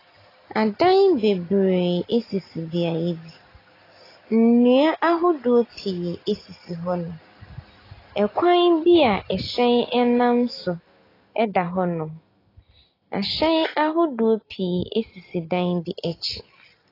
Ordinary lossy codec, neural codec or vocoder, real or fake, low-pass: AAC, 24 kbps; none; real; 5.4 kHz